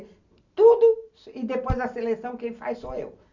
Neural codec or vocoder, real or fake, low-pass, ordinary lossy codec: none; real; 7.2 kHz; none